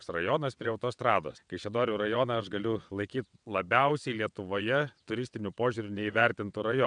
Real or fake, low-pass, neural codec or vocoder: fake; 9.9 kHz; vocoder, 22.05 kHz, 80 mel bands, WaveNeXt